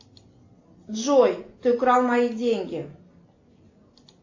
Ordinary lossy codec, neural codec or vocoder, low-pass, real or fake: AAC, 48 kbps; none; 7.2 kHz; real